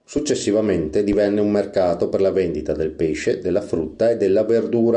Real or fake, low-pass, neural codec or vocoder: real; 9.9 kHz; none